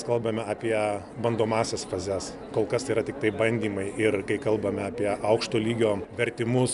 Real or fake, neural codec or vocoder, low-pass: real; none; 10.8 kHz